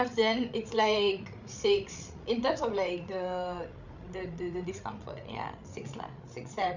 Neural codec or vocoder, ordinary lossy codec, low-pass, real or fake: codec, 16 kHz, 8 kbps, FreqCodec, larger model; none; 7.2 kHz; fake